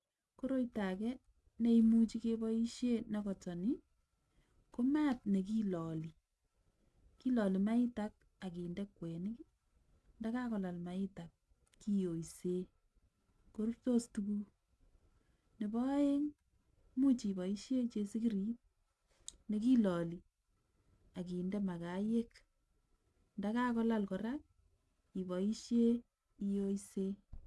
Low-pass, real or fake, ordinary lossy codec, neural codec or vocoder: none; real; none; none